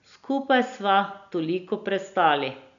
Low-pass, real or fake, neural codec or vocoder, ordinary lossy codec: 7.2 kHz; real; none; none